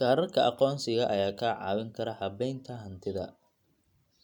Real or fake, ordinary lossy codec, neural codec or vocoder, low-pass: real; none; none; 19.8 kHz